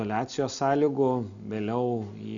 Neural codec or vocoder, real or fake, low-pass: none; real; 7.2 kHz